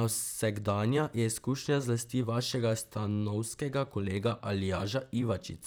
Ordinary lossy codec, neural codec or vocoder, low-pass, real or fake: none; vocoder, 44.1 kHz, 128 mel bands, Pupu-Vocoder; none; fake